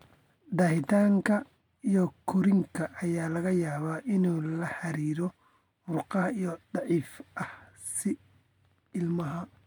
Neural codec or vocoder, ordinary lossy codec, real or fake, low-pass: none; MP3, 96 kbps; real; 19.8 kHz